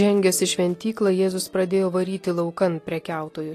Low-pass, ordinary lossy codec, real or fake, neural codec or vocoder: 14.4 kHz; AAC, 48 kbps; real; none